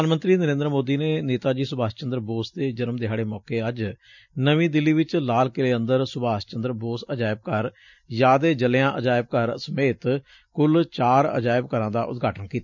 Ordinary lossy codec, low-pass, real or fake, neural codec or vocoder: none; 7.2 kHz; real; none